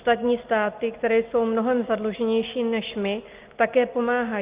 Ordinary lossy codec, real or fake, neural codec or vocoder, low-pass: Opus, 32 kbps; real; none; 3.6 kHz